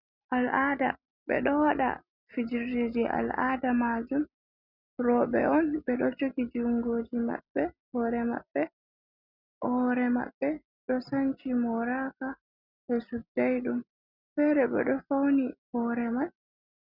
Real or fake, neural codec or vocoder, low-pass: real; none; 5.4 kHz